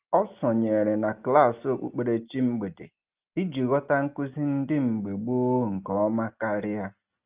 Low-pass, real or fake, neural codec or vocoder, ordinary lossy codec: 3.6 kHz; real; none; Opus, 32 kbps